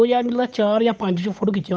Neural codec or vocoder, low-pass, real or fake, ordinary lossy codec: codec, 16 kHz, 8 kbps, FunCodec, trained on Chinese and English, 25 frames a second; none; fake; none